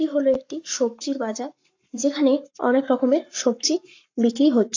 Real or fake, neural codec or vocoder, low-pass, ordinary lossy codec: fake; codec, 44.1 kHz, 7.8 kbps, Pupu-Codec; 7.2 kHz; AAC, 48 kbps